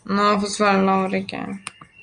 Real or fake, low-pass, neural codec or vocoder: real; 9.9 kHz; none